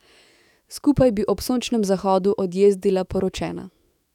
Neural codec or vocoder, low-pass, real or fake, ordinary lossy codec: autoencoder, 48 kHz, 128 numbers a frame, DAC-VAE, trained on Japanese speech; 19.8 kHz; fake; none